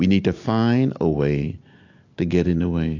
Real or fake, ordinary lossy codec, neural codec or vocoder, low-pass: real; AAC, 48 kbps; none; 7.2 kHz